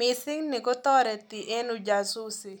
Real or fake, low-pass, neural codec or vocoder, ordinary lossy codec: real; none; none; none